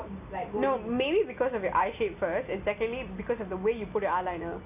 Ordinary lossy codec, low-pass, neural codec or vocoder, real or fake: none; 3.6 kHz; none; real